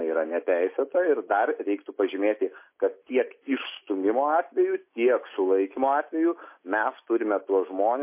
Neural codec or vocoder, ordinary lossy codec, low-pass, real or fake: none; MP3, 24 kbps; 3.6 kHz; real